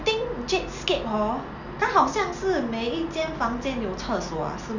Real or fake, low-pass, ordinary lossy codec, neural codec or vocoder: real; 7.2 kHz; none; none